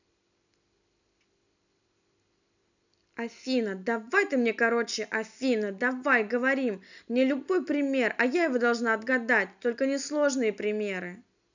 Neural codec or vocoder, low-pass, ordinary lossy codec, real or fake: none; 7.2 kHz; none; real